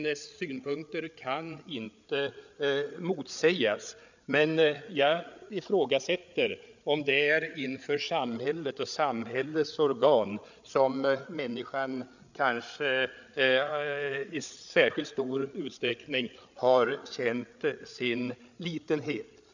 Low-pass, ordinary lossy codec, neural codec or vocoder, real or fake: 7.2 kHz; none; codec, 16 kHz, 8 kbps, FreqCodec, larger model; fake